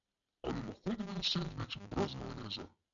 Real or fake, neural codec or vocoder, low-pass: real; none; 7.2 kHz